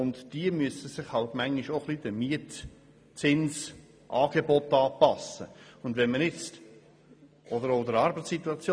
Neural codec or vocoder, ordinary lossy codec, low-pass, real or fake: none; none; none; real